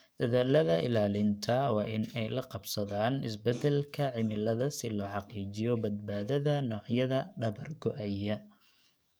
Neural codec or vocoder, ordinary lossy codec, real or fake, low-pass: codec, 44.1 kHz, 7.8 kbps, DAC; none; fake; none